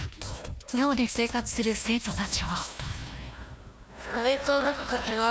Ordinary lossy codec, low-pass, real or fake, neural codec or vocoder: none; none; fake; codec, 16 kHz, 1 kbps, FunCodec, trained on Chinese and English, 50 frames a second